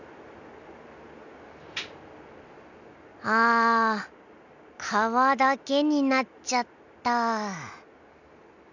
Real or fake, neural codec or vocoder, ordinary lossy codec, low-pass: real; none; none; 7.2 kHz